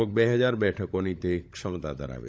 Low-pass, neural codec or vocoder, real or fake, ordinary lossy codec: none; codec, 16 kHz, 16 kbps, FunCodec, trained on LibriTTS, 50 frames a second; fake; none